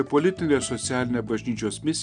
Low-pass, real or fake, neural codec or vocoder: 9.9 kHz; real; none